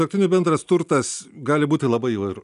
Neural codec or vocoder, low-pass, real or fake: none; 10.8 kHz; real